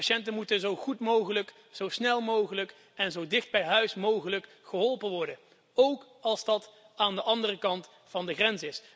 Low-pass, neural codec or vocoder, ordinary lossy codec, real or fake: none; none; none; real